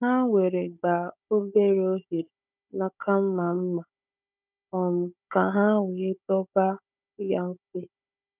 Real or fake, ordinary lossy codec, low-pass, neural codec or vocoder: fake; none; 3.6 kHz; codec, 16 kHz, 16 kbps, FunCodec, trained on Chinese and English, 50 frames a second